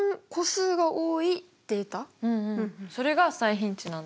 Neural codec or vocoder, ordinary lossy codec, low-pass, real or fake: none; none; none; real